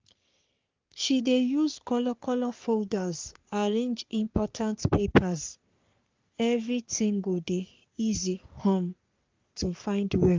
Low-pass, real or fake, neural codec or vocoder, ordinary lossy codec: 7.2 kHz; fake; codec, 44.1 kHz, 3.4 kbps, Pupu-Codec; Opus, 24 kbps